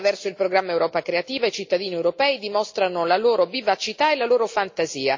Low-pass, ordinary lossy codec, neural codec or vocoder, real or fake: 7.2 kHz; MP3, 48 kbps; none; real